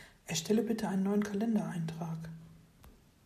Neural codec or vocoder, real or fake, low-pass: none; real; 14.4 kHz